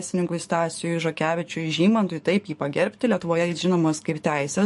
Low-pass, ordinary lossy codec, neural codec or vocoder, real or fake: 14.4 kHz; MP3, 48 kbps; codec, 44.1 kHz, 7.8 kbps, DAC; fake